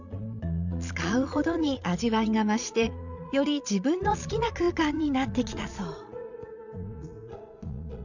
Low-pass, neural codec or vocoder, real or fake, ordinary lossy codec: 7.2 kHz; vocoder, 22.05 kHz, 80 mel bands, Vocos; fake; none